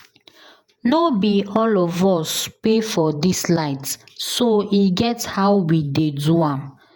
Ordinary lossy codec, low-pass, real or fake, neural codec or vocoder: none; none; fake; vocoder, 48 kHz, 128 mel bands, Vocos